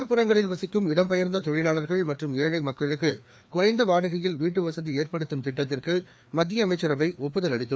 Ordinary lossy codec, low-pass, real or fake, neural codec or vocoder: none; none; fake; codec, 16 kHz, 2 kbps, FreqCodec, larger model